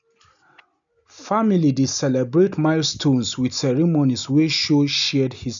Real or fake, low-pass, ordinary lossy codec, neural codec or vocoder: real; 7.2 kHz; none; none